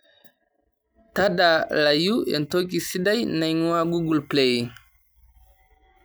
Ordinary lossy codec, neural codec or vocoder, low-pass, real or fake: none; none; none; real